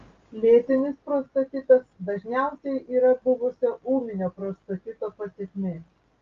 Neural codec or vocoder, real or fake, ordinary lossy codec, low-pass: none; real; Opus, 32 kbps; 7.2 kHz